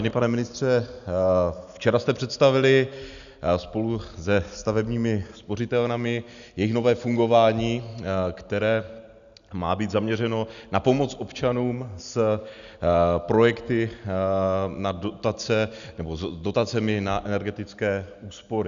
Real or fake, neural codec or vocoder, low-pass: real; none; 7.2 kHz